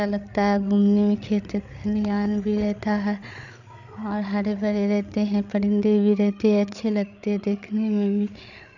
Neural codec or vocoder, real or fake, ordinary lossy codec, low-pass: codec, 16 kHz, 8 kbps, FreqCodec, larger model; fake; none; 7.2 kHz